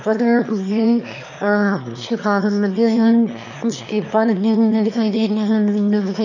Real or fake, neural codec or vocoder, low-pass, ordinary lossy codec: fake; autoencoder, 22.05 kHz, a latent of 192 numbers a frame, VITS, trained on one speaker; 7.2 kHz; none